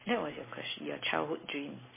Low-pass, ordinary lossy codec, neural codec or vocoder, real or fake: 3.6 kHz; MP3, 16 kbps; none; real